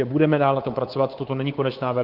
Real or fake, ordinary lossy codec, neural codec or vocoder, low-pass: fake; Opus, 16 kbps; codec, 24 kHz, 3.1 kbps, DualCodec; 5.4 kHz